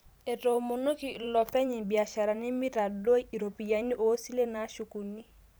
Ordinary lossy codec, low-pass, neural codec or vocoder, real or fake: none; none; vocoder, 44.1 kHz, 128 mel bands every 512 samples, BigVGAN v2; fake